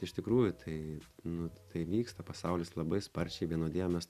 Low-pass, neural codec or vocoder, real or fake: 14.4 kHz; none; real